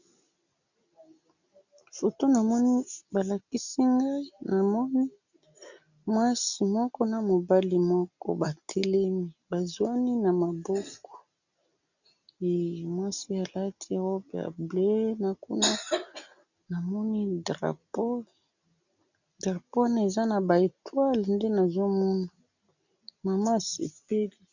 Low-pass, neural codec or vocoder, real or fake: 7.2 kHz; none; real